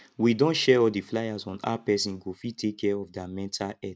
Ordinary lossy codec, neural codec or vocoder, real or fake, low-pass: none; none; real; none